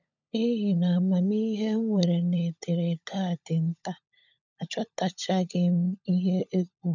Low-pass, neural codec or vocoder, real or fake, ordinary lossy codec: 7.2 kHz; codec, 16 kHz, 16 kbps, FunCodec, trained on LibriTTS, 50 frames a second; fake; none